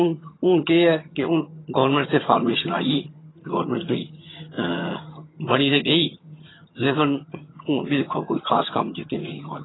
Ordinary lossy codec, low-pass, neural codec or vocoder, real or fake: AAC, 16 kbps; 7.2 kHz; vocoder, 22.05 kHz, 80 mel bands, HiFi-GAN; fake